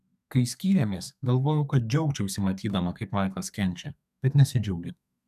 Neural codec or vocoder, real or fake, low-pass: codec, 32 kHz, 1.9 kbps, SNAC; fake; 14.4 kHz